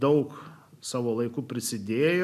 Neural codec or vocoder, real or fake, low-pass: none; real; 14.4 kHz